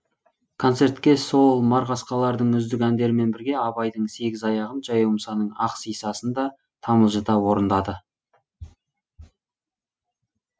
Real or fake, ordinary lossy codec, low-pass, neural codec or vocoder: real; none; none; none